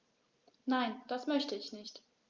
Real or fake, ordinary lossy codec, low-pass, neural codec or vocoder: real; Opus, 32 kbps; 7.2 kHz; none